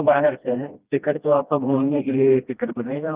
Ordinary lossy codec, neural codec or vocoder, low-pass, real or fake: Opus, 32 kbps; codec, 16 kHz, 1 kbps, FreqCodec, smaller model; 3.6 kHz; fake